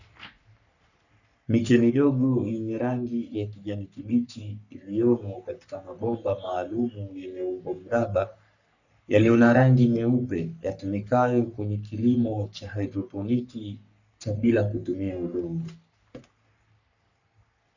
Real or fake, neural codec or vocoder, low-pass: fake; codec, 44.1 kHz, 3.4 kbps, Pupu-Codec; 7.2 kHz